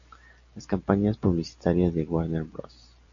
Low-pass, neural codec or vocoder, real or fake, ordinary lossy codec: 7.2 kHz; none; real; AAC, 64 kbps